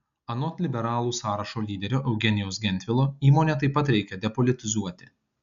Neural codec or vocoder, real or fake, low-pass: none; real; 7.2 kHz